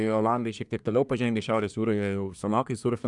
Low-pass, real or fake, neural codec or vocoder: 10.8 kHz; fake; codec, 24 kHz, 1 kbps, SNAC